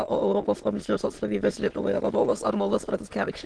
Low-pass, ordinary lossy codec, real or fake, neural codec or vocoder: 9.9 kHz; Opus, 16 kbps; fake; autoencoder, 22.05 kHz, a latent of 192 numbers a frame, VITS, trained on many speakers